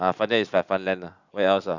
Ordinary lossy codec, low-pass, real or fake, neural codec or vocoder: none; 7.2 kHz; real; none